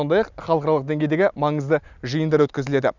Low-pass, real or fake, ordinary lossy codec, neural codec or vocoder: 7.2 kHz; real; none; none